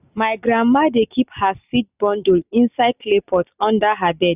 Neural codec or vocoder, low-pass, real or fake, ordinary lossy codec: none; 3.6 kHz; real; none